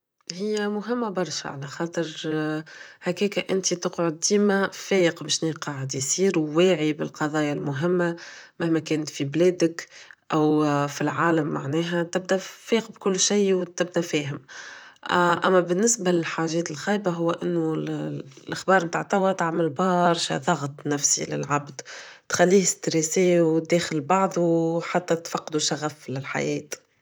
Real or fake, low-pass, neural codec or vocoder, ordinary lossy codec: fake; none; vocoder, 44.1 kHz, 128 mel bands, Pupu-Vocoder; none